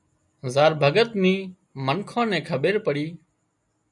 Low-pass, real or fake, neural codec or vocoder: 10.8 kHz; real; none